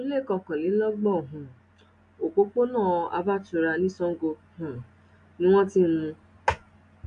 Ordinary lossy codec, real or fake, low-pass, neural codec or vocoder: AAC, 64 kbps; real; 9.9 kHz; none